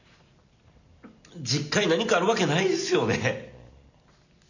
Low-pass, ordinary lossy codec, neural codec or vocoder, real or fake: 7.2 kHz; none; none; real